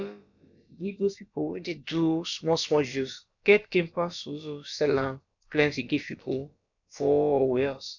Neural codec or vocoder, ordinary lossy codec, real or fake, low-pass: codec, 16 kHz, about 1 kbps, DyCAST, with the encoder's durations; none; fake; 7.2 kHz